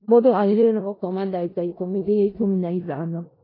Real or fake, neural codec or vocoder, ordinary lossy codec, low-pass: fake; codec, 16 kHz in and 24 kHz out, 0.4 kbps, LongCat-Audio-Codec, four codebook decoder; AAC, 24 kbps; 5.4 kHz